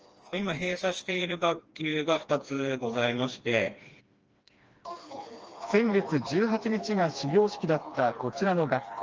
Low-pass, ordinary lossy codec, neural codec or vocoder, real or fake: 7.2 kHz; Opus, 24 kbps; codec, 16 kHz, 2 kbps, FreqCodec, smaller model; fake